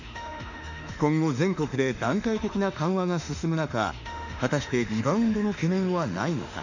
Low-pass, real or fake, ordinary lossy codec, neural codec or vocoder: 7.2 kHz; fake; none; autoencoder, 48 kHz, 32 numbers a frame, DAC-VAE, trained on Japanese speech